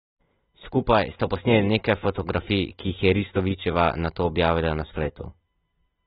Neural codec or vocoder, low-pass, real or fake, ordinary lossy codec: none; 19.8 kHz; real; AAC, 16 kbps